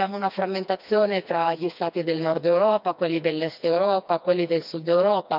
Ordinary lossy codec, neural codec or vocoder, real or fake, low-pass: none; codec, 16 kHz, 2 kbps, FreqCodec, smaller model; fake; 5.4 kHz